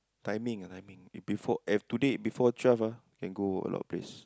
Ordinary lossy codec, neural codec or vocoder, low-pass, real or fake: none; none; none; real